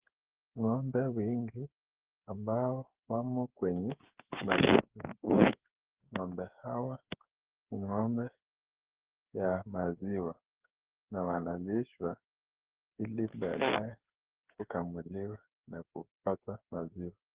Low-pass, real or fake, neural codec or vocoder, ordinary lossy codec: 3.6 kHz; fake; codec, 16 kHz, 8 kbps, FreqCodec, smaller model; Opus, 16 kbps